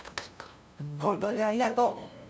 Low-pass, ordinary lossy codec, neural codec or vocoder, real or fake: none; none; codec, 16 kHz, 0.5 kbps, FunCodec, trained on LibriTTS, 25 frames a second; fake